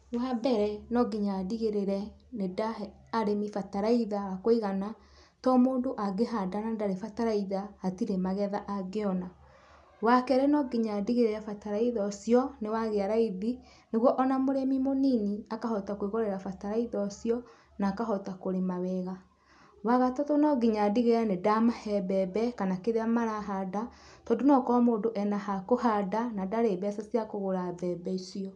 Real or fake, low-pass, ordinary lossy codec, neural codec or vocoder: real; 10.8 kHz; none; none